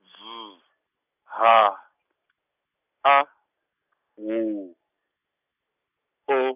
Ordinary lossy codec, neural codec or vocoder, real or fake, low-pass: none; none; real; 3.6 kHz